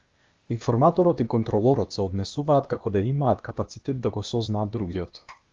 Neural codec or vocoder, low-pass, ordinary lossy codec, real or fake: codec, 16 kHz, 0.8 kbps, ZipCodec; 7.2 kHz; Opus, 32 kbps; fake